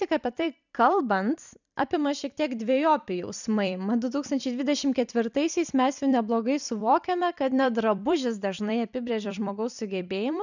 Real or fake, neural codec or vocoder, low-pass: fake; vocoder, 44.1 kHz, 128 mel bands every 256 samples, BigVGAN v2; 7.2 kHz